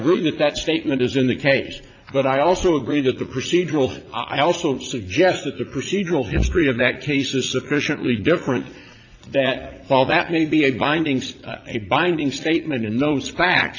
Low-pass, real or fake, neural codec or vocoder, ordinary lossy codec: 7.2 kHz; fake; vocoder, 44.1 kHz, 80 mel bands, Vocos; AAC, 48 kbps